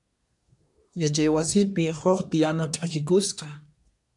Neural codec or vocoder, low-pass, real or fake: codec, 24 kHz, 1 kbps, SNAC; 10.8 kHz; fake